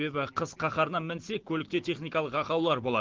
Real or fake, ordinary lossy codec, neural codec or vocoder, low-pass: real; Opus, 16 kbps; none; 7.2 kHz